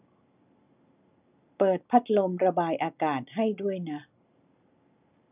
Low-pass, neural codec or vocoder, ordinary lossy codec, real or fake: 3.6 kHz; none; none; real